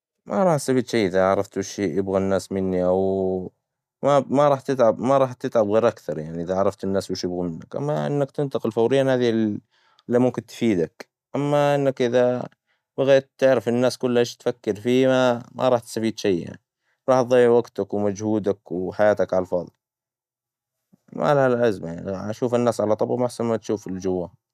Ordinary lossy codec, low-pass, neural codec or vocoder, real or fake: none; 14.4 kHz; none; real